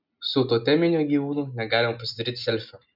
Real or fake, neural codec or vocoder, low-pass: real; none; 5.4 kHz